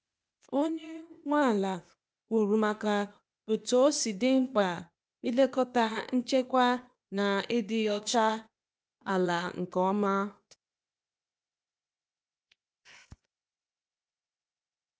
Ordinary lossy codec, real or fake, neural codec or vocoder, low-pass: none; fake; codec, 16 kHz, 0.8 kbps, ZipCodec; none